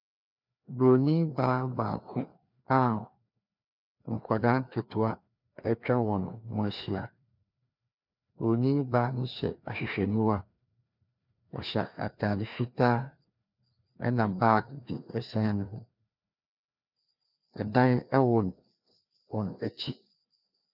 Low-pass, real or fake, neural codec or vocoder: 5.4 kHz; fake; codec, 16 kHz, 2 kbps, FreqCodec, larger model